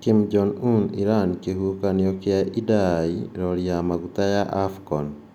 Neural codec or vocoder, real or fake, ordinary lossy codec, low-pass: none; real; none; 19.8 kHz